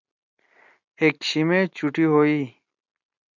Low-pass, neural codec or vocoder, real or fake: 7.2 kHz; none; real